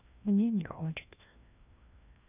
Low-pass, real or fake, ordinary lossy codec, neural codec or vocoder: 3.6 kHz; fake; none; codec, 16 kHz, 1 kbps, FreqCodec, larger model